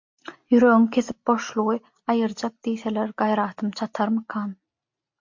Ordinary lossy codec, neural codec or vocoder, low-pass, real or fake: MP3, 48 kbps; none; 7.2 kHz; real